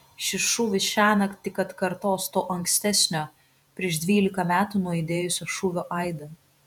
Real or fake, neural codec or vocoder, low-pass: fake; vocoder, 48 kHz, 128 mel bands, Vocos; 19.8 kHz